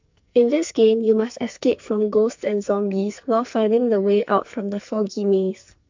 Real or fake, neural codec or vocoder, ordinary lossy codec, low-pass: fake; codec, 44.1 kHz, 2.6 kbps, SNAC; MP3, 64 kbps; 7.2 kHz